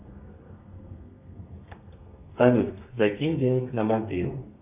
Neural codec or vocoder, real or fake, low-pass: codec, 32 kHz, 1.9 kbps, SNAC; fake; 3.6 kHz